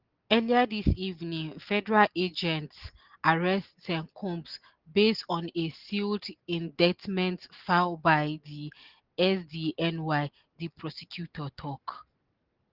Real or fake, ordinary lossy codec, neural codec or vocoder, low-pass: real; Opus, 16 kbps; none; 5.4 kHz